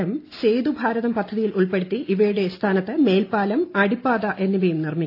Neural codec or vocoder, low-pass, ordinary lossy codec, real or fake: none; 5.4 kHz; AAC, 32 kbps; real